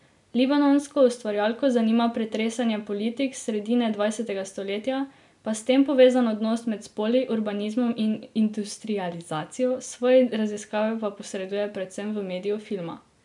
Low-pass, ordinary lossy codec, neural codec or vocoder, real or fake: 10.8 kHz; none; none; real